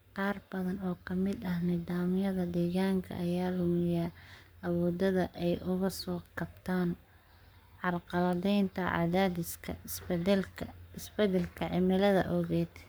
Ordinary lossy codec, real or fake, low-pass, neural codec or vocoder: none; fake; none; codec, 44.1 kHz, 7.8 kbps, Pupu-Codec